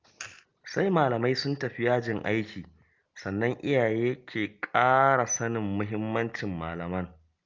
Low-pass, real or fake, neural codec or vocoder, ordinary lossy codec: 7.2 kHz; real; none; Opus, 24 kbps